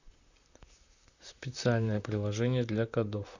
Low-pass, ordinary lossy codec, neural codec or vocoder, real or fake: 7.2 kHz; none; vocoder, 44.1 kHz, 128 mel bands, Pupu-Vocoder; fake